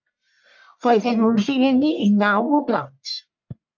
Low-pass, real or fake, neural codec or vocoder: 7.2 kHz; fake; codec, 44.1 kHz, 1.7 kbps, Pupu-Codec